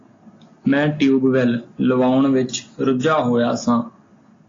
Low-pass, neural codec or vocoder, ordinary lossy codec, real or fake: 7.2 kHz; none; AAC, 32 kbps; real